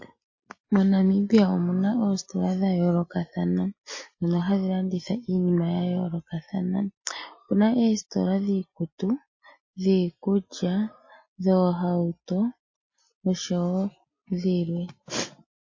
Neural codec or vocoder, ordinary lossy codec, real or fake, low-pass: none; MP3, 32 kbps; real; 7.2 kHz